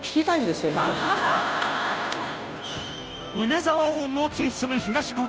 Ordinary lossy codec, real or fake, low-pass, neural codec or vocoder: none; fake; none; codec, 16 kHz, 0.5 kbps, FunCodec, trained on Chinese and English, 25 frames a second